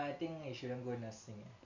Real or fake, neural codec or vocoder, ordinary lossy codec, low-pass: real; none; none; 7.2 kHz